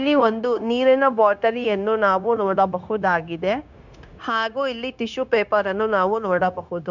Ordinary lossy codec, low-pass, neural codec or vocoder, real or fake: none; 7.2 kHz; codec, 16 kHz, 0.9 kbps, LongCat-Audio-Codec; fake